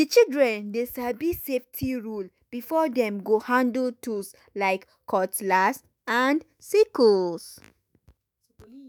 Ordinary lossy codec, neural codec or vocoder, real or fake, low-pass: none; autoencoder, 48 kHz, 128 numbers a frame, DAC-VAE, trained on Japanese speech; fake; none